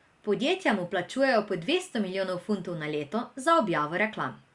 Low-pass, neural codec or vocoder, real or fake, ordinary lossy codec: 10.8 kHz; none; real; Opus, 64 kbps